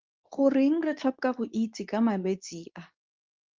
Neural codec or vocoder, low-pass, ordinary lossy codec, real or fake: codec, 24 kHz, 0.9 kbps, WavTokenizer, medium speech release version 2; 7.2 kHz; Opus, 32 kbps; fake